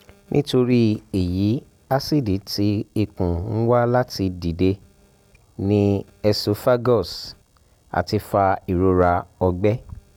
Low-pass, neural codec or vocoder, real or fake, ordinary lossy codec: 19.8 kHz; none; real; none